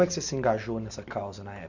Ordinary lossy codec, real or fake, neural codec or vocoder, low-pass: none; real; none; 7.2 kHz